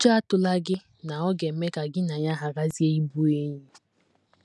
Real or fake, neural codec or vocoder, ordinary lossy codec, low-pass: real; none; none; none